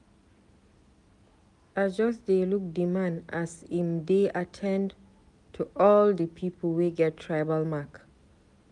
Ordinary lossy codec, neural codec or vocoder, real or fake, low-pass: none; none; real; 10.8 kHz